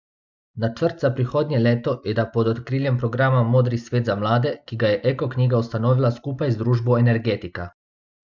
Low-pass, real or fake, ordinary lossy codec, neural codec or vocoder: 7.2 kHz; real; MP3, 64 kbps; none